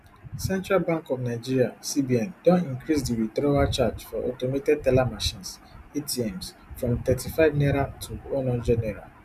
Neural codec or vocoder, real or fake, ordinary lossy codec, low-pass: none; real; none; 14.4 kHz